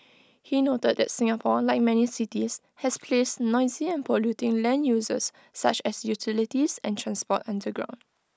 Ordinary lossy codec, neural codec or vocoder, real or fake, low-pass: none; none; real; none